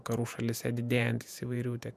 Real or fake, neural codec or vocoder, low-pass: fake; vocoder, 48 kHz, 128 mel bands, Vocos; 14.4 kHz